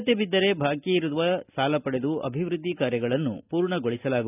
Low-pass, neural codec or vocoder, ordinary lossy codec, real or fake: 3.6 kHz; none; none; real